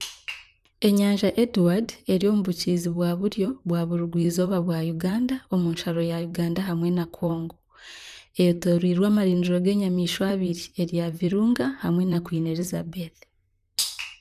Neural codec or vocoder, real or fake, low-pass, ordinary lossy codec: vocoder, 44.1 kHz, 128 mel bands, Pupu-Vocoder; fake; 14.4 kHz; none